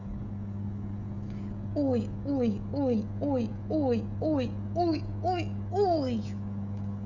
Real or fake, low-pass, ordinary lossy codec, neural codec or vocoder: fake; 7.2 kHz; none; codec, 16 kHz, 16 kbps, FreqCodec, smaller model